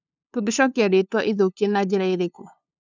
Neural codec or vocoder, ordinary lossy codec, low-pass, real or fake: codec, 16 kHz, 2 kbps, FunCodec, trained on LibriTTS, 25 frames a second; none; 7.2 kHz; fake